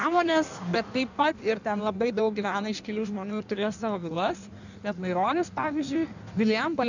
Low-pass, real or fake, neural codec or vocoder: 7.2 kHz; fake; codec, 16 kHz in and 24 kHz out, 1.1 kbps, FireRedTTS-2 codec